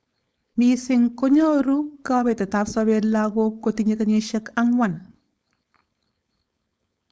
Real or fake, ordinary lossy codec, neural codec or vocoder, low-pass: fake; none; codec, 16 kHz, 4.8 kbps, FACodec; none